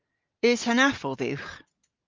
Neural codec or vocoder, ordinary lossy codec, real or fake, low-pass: none; Opus, 24 kbps; real; 7.2 kHz